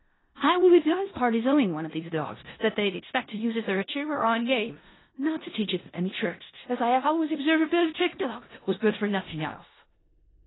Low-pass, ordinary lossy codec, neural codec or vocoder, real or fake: 7.2 kHz; AAC, 16 kbps; codec, 16 kHz in and 24 kHz out, 0.4 kbps, LongCat-Audio-Codec, four codebook decoder; fake